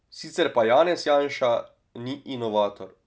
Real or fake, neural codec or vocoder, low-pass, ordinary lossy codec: real; none; none; none